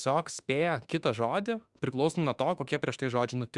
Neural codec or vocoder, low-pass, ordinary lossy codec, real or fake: autoencoder, 48 kHz, 32 numbers a frame, DAC-VAE, trained on Japanese speech; 10.8 kHz; Opus, 64 kbps; fake